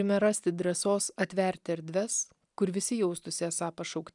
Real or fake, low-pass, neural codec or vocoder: real; 10.8 kHz; none